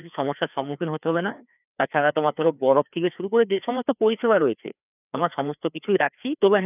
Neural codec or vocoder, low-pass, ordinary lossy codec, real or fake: codec, 16 kHz, 2 kbps, FreqCodec, larger model; 3.6 kHz; none; fake